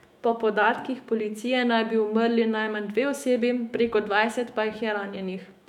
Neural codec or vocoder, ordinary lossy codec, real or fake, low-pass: autoencoder, 48 kHz, 128 numbers a frame, DAC-VAE, trained on Japanese speech; none; fake; 19.8 kHz